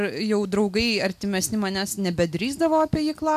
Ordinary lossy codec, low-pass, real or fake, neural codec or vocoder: MP3, 96 kbps; 14.4 kHz; real; none